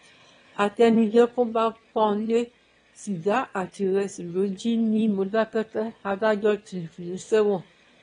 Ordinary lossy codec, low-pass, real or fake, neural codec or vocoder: AAC, 32 kbps; 9.9 kHz; fake; autoencoder, 22.05 kHz, a latent of 192 numbers a frame, VITS, trained on one speaker